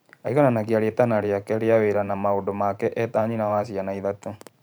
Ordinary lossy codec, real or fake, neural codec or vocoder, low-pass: none; fake; vocoder, 44.1 kHz, 128 mel bands every 512 samples, BigVGAN v2; none